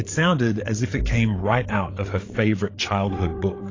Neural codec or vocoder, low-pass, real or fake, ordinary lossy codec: codec, 16 kHz, 8 kbps, FreqCodec, larger model; 7.2 kHz; fake; AAC, 32 kbps